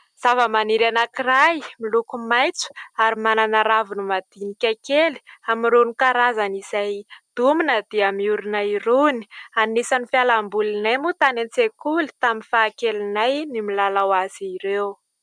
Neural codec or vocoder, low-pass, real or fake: none; 9.9 kHz; real